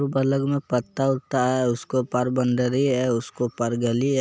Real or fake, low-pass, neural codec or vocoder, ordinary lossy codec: real; none; none; none